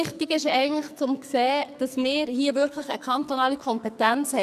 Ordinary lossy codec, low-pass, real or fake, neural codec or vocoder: none; 14.4 kHz; fake; codec, 44.1 kHz, 2.6 kbps, SNAC